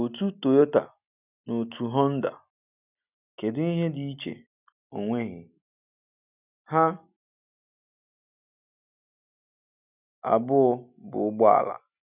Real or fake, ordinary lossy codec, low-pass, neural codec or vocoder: real; none; 3.6 kHz; none